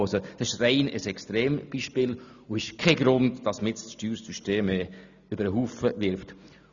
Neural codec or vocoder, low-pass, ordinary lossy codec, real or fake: none; 7.2 kHz; none; real